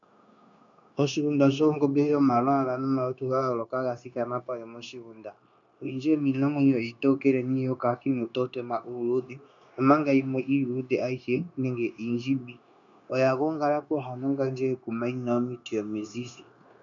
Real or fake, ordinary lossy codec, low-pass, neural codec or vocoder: fake; MP3, 64 kbps; 7.2 kHz; codec, 16 kHz, 0.9 kbps, LongCat-Audio-Codec